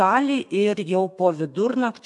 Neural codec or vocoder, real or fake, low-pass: codec, 32 kHz, 1.9 kbps, SNAC; fake; 10.8 kHz